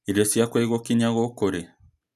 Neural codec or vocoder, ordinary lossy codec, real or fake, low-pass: none; none; real; 14.4 kHz